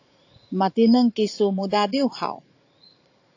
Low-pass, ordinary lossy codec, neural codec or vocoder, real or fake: 7.2 kHz; AAC, 48 kbps; vocoder, 44.1 kHz, 80 mel bands, Vocos; fake